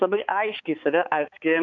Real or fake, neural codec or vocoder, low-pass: fake; codec, 16 kHz, 4 kbps, X-Codec, HuBERT features, trained on balanced general audio; 7.2 kHz